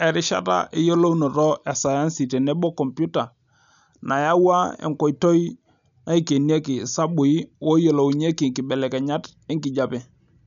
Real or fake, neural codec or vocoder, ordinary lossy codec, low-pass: real; none; none; 7.2 kHz